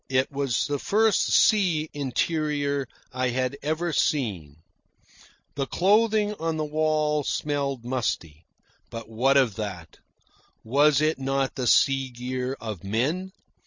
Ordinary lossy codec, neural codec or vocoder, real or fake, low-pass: MP3, 64 kbps; none; real; 7.2 kHz